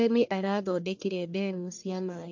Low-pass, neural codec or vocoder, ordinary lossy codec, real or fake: 7.2 kHz; codec, 44.1 kHz, 1.7 kbps, Pupu-Codec; MP3, 48 kbps; fake